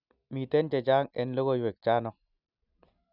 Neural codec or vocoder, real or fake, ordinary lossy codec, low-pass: none; real; none; 5.4 kHz